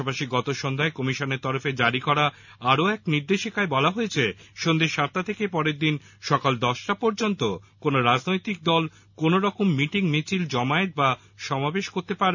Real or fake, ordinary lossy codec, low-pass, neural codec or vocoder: real; none; 7.2 kHz; none